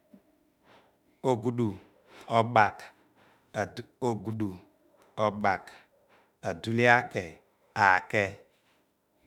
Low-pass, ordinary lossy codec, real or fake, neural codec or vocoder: 19.8 kHz; none; fake; autoencoder, 48 kHz, 32 numbers a frame, DAC-VAE, trained on Japanese speech